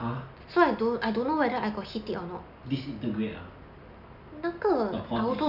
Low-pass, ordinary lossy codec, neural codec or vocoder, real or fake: 5.4 kHz; none; none; real